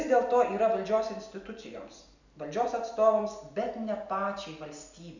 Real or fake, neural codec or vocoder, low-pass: real; none; 7.2 kHz